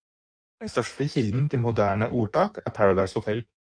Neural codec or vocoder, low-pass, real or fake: codec, 16 kHz in and 24 kHz out, 1.1 kbps, FireRedTTS-2 codec; 9.9 kHz; fake